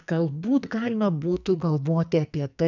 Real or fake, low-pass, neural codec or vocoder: fake; 7.2 kHz; codec, 44.1 kHz, 2.6 kbps, SNAC